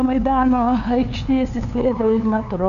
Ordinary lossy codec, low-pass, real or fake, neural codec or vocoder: MP3, 96 kbps; 7.2 kHz; fake; codec, 16 kHz, 2 kbps, FunCodec, trained on LibriTTS, 25 frames a second